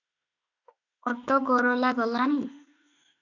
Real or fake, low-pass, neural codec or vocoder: fake; 7.2 kHz; autoencoder, 48 kHz, 32 numbers a frame, DAC-VAE, trained on Japanese speech